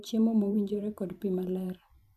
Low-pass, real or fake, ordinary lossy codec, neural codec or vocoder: 14.4 kHz; fake; none; vocoder, 44.1 kHz, 128 mel bands every 512 samples, BigVGAN v2